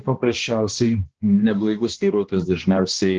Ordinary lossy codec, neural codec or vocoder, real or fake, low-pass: Opus, 16 kbps; codec, 16 kHz, 1 kbps, X-Codec, HuBERT features, trained on balanced general audio; fake; 7.2 kHz